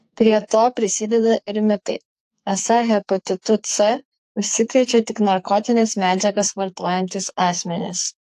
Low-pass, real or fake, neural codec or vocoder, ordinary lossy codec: 14.4 kHz; fake; codec, 44.1 kHz, 2.6 kbps, SNAC; AAC, 64 kbps